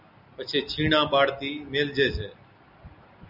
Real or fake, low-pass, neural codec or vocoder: real; 5.4 kHz; none